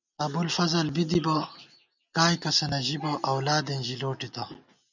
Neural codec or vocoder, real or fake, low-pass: none; real; 7.2 kHz